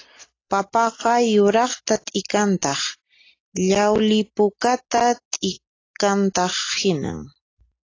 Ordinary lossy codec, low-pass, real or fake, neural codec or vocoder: AAC, 32 kbps; 7.2 kHz; real; none